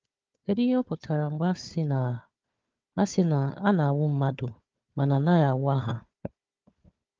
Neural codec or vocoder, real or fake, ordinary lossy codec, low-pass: codec, 16 kHz, 4 kbps, FunCodec, trained on Chinese and English, 50 frames a second; fake; Opus, 24 kbps; 7.2 kHz